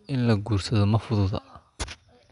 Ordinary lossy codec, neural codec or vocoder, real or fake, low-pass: none; none; real; 10.8 kHz